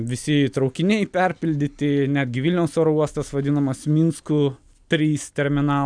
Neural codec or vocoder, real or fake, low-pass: none; real; 9.9 kHz